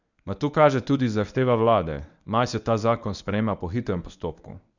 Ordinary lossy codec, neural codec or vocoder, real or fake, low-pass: none; codec, 24 kHz, 0.9 kbps, WavTokenizer, medium speech release version 1; fake; 7.2 kHz